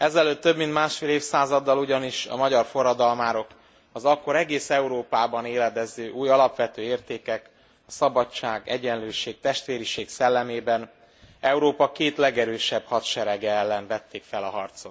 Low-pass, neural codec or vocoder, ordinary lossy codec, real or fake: none; none; none; real